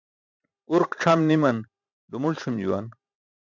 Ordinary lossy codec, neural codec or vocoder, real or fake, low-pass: MP3, 64 kbps; vocoder, 44.1 kHz, 128 mel bands every 512 samples, BigVGAN v2; fake; 7.2 kHz